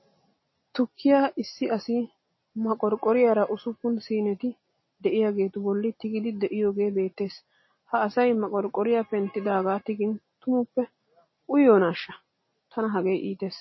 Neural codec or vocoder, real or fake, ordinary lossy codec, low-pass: none; real; MP3, 24 kbps; 7.2 kHz